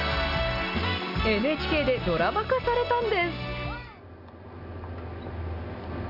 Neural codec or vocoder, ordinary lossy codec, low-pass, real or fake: none; none; 5.4 kHz; real